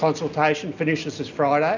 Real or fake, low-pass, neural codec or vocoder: real; 7.2 kHz; none